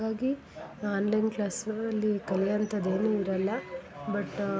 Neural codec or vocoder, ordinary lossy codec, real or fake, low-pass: none; none; real; none